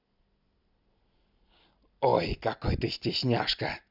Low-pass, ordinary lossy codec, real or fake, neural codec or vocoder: 5.4 kHz; none; real; none